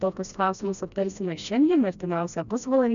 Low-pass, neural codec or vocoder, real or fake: 7.2 kHz; codec, 16 kHz, 1 kbps, FreqCodec, smaller model; fake